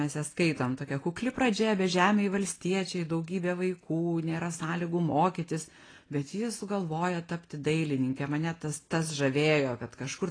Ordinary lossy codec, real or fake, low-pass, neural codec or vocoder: AAC, 32 kbps; real; 9.9 kHz; none